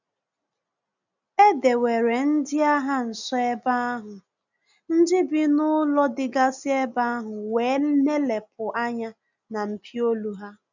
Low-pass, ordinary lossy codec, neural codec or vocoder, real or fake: 7.2 kHz; none; none; real